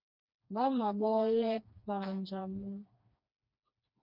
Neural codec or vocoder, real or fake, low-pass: codec, 16 kHz, 2 kbps, FreqCodec, smaller model; fake; 5.4 kHz